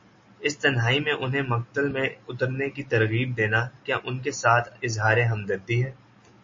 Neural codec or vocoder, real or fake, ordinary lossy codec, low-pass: none; real; MP3, 32 kbps; 7.2 kHz